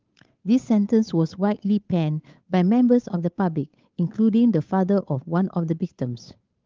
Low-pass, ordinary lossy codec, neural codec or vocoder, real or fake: 7.2 kHz; Opus, 32 kbps; codec, 16 kHz, 8 kbps, FunCodec, trained on Chinese and English, 25 frames a second; fake